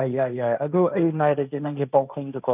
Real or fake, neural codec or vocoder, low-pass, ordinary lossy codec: fake; codec, 16 kHz, 1.1 kbps, Voila-Tokenizer; 3.6 kHz; none